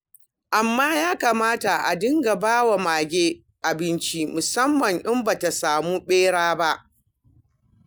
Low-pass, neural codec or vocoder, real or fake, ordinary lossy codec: none; none; real; none